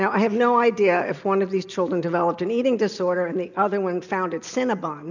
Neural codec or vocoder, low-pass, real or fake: none; 7.2 kHz; real